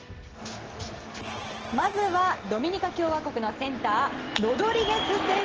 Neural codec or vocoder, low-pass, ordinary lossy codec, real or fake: none; 7.2 kHz; Opus, 16 kbps; real